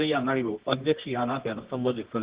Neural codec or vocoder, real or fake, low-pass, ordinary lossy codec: codec, 24 kHz, 0.9 kbps, WavTokenizer, medium music audio release; fake; 3.6 kHz; Opus, 16 kbps